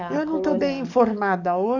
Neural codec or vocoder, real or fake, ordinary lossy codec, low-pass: codec, 44.1 kHz, 7.8 kbps, DAC; fake; none; 7.2 kHz